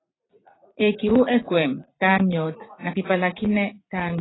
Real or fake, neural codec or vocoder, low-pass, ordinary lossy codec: fake; vocoder, 44.1 kHz, 80 mel bands, Vocos; 7.2 kHz; AAC, 16 kbps